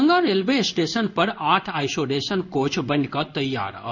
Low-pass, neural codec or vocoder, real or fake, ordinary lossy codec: 7.2 kHz; codec, 16 kHz in and 24 kHz out, 1 kbps, XY-Tokenizer; fake; none